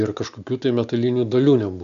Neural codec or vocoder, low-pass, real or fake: none; 7.2 kHz; real